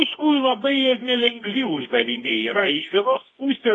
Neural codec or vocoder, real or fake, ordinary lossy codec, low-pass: codec, 24 kHz, 0.9 kbps, WavTokenizer, medium music audio release; fake; AAC, 32 kbps; 10.8 kHz